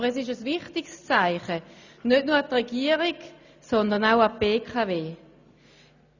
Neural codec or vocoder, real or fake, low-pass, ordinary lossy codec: none; real; 7.2 kHz; none